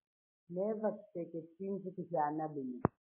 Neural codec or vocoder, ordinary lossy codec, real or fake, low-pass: none; MP3, 16 kbps; real; 3.6 kHz